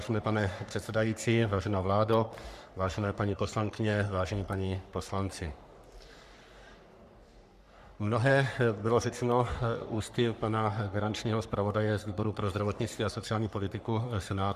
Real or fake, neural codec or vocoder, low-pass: fake; codec, 44.1 kHz, 3.4 kbps, Pupu-Codec; 14.4 kHz